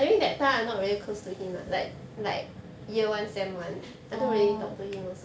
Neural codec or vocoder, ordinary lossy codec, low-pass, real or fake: none; none; none; real